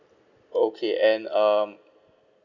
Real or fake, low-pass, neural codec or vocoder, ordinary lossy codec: real; 7.2 kHz; none; none